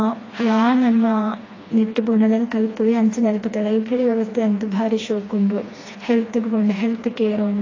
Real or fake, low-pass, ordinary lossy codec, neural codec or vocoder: fake; 7.2 kHz; AAC, 32 kbps; codec, 16 kHz, 2 kbps, FreqCodec, smaller model